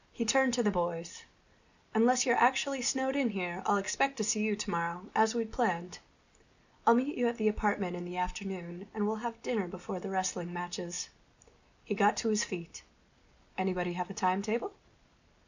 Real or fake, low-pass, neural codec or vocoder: real; 7.2 kHz; none